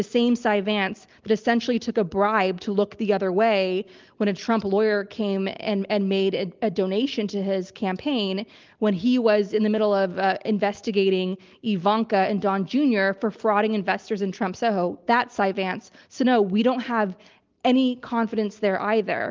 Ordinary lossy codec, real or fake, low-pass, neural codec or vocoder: Opus, 32 kbps; real; 7.2 kHz; none